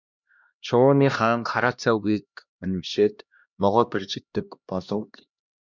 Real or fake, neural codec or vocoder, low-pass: fake; codec, 16 kHz, 1 kbps, X-Codec, HuBERT features, trained on LibriSpeech; 7.2 kHz